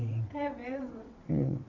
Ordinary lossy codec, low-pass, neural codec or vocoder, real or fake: none; 7.2 kHz; vocoder, 22.05 kHz, 80 mel bands, WaveNeXt; fake